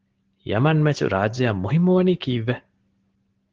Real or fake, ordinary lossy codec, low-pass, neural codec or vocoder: real; Opus, 16 kbps; 7.2 kHz; none